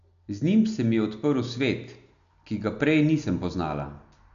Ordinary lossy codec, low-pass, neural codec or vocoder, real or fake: none; 7.2 kHz; none; real